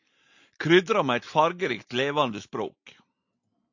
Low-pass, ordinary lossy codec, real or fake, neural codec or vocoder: 7.2 kHz; AAC, 48 kbps; real; none